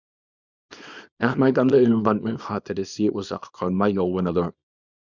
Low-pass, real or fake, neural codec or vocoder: 7.2 kHz; fake; codec, 24 kHz, 0.9 kbps, WavTokenizer, small release